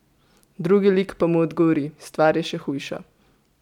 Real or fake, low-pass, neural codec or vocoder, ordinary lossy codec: real; 19.8 kHz; none; none